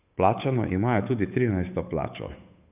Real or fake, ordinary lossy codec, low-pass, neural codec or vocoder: fake; none; 3.6 kHz; codec, 16 kHz, 8 kbps, FunCodec, trained on Chinese and English, 25 frames a second